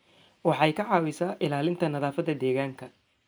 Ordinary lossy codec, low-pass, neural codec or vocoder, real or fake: none; none; none; real